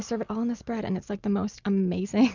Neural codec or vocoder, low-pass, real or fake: none; 7.2 kHz; real